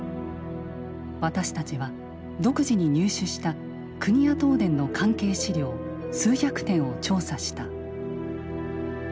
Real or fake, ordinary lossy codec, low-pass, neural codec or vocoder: real; none; none; none